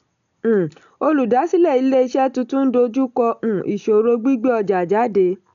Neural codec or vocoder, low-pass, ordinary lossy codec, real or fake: none; 7.2 kHz; none; real